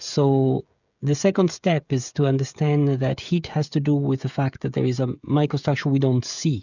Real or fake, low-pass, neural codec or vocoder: fake; 7.2 kHz; codec, 16 kHz, 16 kbps, FreqCodec, smaller model